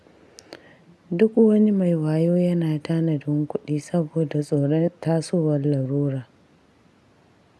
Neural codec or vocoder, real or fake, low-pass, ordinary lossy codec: none; real; none; none